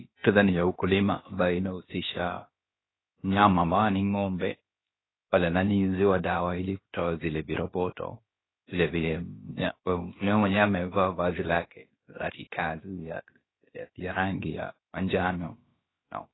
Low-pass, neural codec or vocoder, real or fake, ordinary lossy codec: 7.2 kHz; codec, 16 kHz, about 1 kbps, DyCAST, with the encoder's durations; fake; AAC, 16 kbps